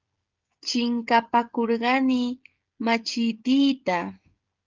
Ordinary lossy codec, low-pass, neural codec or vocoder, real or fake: Opus, 32 kbps; 7.2 kHz; codec, 16 kHz, 16 kbps, FreqCodec, smaller model; fake